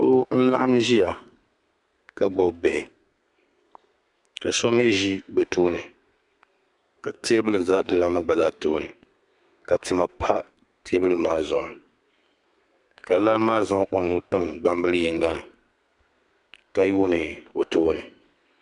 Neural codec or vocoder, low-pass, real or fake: codec, 32 kHz, 1.9 kbps, SNAC; 10.8 kHz; fake